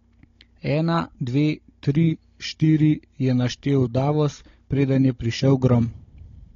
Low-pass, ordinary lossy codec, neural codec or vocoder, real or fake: 7.2 kHz; AAC, 32 kbps; codec, 16 kHz, 16 kbps, FunCodec, trained on Chinese and English, 50 frames a second; fake